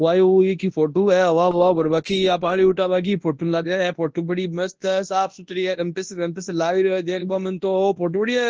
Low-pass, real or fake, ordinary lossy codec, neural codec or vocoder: 7.2 kHz; fake; Opus, 16 kbps; codec, 24 kHz, 0.9 kbps, WavTokenizer, large speech release